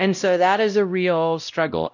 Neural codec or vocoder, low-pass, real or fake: codec, 16 kHz, 0.5 kbps, X-Codec, WavLM features, trained on Multilingual LibriSpeech; 7.2 kHz; fake